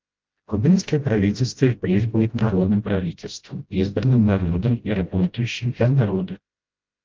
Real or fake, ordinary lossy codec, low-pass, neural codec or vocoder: fake; Opus, 32 kbps; 7.2 kHz; codec, 16 kHz, 0.5 kbps, FreqCodec, smaller model